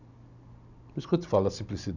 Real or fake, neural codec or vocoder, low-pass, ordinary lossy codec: real; none; 7.2 kHz; none